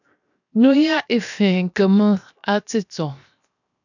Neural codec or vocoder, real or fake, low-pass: codec, 16 kHz, 0.7 kbps, FocalCodec; fake; 7.2 kHz